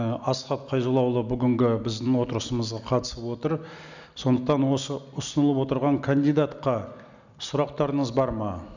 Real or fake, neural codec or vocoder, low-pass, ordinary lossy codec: real; none; 7.2 kHz; none